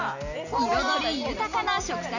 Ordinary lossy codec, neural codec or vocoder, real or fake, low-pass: none; none; real; 7.2 kHz